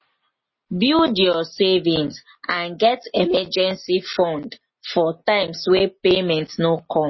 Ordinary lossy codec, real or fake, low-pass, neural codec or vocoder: MP3, 24 kbps; real; 7.2 kHz; none